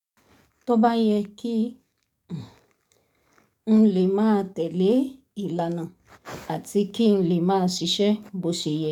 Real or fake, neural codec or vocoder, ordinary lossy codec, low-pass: fake; codec, 44.1 kHz, 7.8 kbps, DAC; none; 19.8 kHz